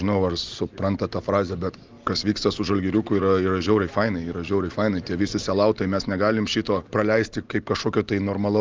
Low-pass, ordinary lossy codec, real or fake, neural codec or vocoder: 7.2 kHz; Opus, 32 kbps; real; none